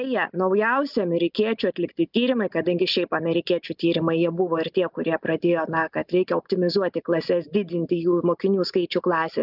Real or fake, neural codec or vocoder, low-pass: real; none; 5.4 kHz